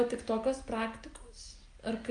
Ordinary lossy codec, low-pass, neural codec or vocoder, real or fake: Opus, 32 kbps; 9.9 kHz; none; real